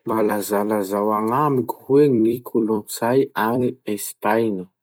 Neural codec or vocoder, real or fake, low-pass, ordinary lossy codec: vocoder, 44.1 kHz, 128 mel bands every 256 samples, BigVGAN v2; fake; none; none